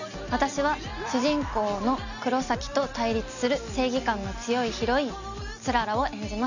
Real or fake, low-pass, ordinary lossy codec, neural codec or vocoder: real; 7.2 kHz; none; none